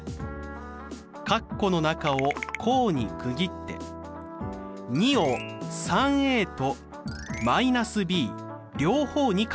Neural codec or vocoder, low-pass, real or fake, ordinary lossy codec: none; none; real; none